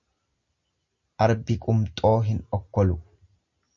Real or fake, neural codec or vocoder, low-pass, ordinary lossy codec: real; none; 7.2 kHz; MP3, 48 kbps